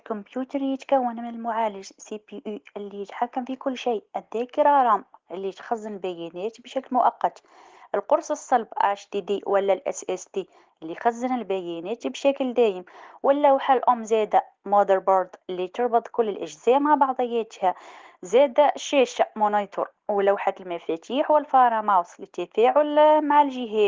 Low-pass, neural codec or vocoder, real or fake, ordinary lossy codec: 7.2 kHz; none; real; Opus, 16 kbps